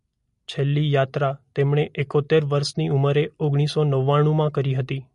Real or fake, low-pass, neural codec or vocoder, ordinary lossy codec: real; 10.8 kHz; none; MP3, 48 kbps